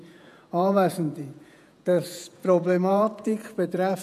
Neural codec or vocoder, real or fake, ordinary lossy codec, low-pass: vocoder, 48 kHz, 128 mel bands, Vocos; fake; none; 14.4 kHz